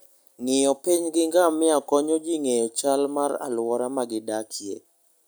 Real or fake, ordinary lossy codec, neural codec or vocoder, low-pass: real; none; none; none